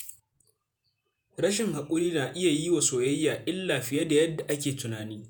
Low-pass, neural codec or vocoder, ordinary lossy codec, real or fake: none; vocoder, 48 kHz, 128 mel bands, Vocos; none; fake